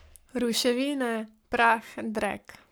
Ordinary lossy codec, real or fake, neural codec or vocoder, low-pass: none; fake; codec, 44.1 kHz, 7.8 kbps, Pupu-Codec; none